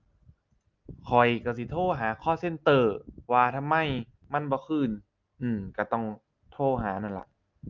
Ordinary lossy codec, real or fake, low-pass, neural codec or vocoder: Opus, 24 kbps; real; 7.2 kHz; none